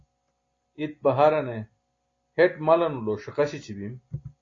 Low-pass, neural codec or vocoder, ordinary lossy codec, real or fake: 7.2 kHz; none; AAC, 32 kbps; real